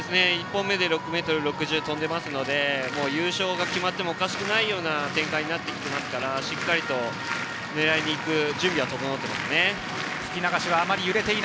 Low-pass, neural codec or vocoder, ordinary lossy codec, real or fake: none; none; none; real